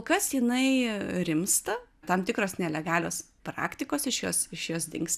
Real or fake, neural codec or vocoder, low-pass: real; none; 14.4 kHz